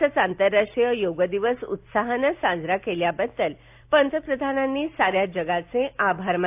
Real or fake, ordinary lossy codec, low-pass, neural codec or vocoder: real; AAC, 32 kbps; 3.6 kHz; none